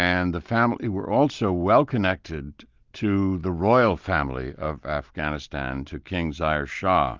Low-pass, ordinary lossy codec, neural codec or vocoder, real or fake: 7.2 kHz; Opus, 32 kbps; none; real